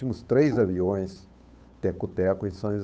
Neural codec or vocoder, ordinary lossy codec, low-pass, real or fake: codec, 16 kHz, 8 kbps, FunCodec, trained on Chinese and English, 25 frames a second; none; none; fake